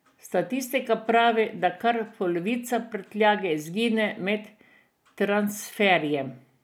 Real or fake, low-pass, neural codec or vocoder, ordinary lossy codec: real; none; none; none